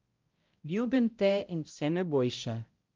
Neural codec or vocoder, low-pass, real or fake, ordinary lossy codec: codec, 16 kHz, 0.5 kbps, X-Codec, HuBERT features, trained on balanced general audio; 7.2 kHz; fake; Opus, 32 kbps